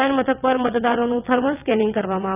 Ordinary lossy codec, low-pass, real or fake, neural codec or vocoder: none; 3.6 kHz; fake; vocoder, 22.05 kHz, 80 mel bands, WaveNeXt